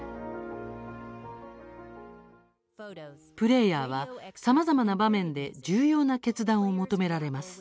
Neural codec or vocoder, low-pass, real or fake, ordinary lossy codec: none; none; real; none